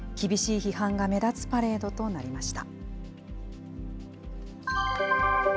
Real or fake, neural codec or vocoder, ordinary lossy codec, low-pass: real; none; none; none